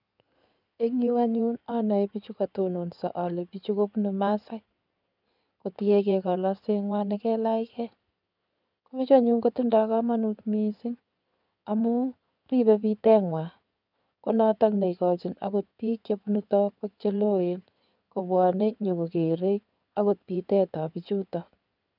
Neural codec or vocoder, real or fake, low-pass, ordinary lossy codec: codec, 16 kHz in and 24 kHz out, 2.2 kbps, FireRedTTS-2 codec; fake; 5.4 kHz; none